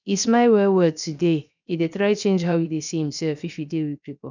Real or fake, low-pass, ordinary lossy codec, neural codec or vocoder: fake; 7.2 kHz; none; codec, 16 kHz, 0.7 kbps, FocalCodec